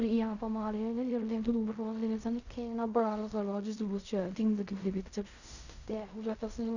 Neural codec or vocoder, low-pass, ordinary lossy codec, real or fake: codec, 16 kHz in and 24 kHz out, 0.4 kbps, LongCat-Audio-Codec, fine tuned four codebook decoder; 7.2 kHz; none; fake